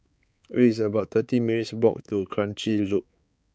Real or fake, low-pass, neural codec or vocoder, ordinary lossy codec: fake; none; codec, 16 kHz, 4 kbps, X-Codec, HuBERT features, trained on balanced general audio; none